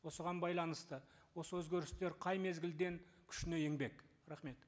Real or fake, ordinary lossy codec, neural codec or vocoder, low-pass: real; none; none; none